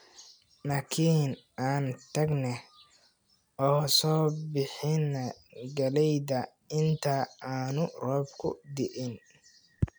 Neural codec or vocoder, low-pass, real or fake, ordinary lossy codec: none; none; real; none